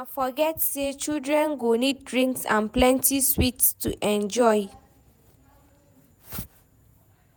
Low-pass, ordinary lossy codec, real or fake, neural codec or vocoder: none; none; fake; vocoder, 48 kHz, 128 mel bands, Vocos